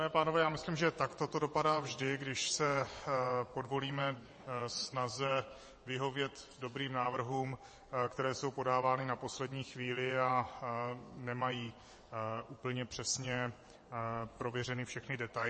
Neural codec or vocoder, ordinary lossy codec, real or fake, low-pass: vocoder, 22.05 kHz, 80 mel bands, WaveNeXt; MP3, 32 kbps; fake; 9.9 kHz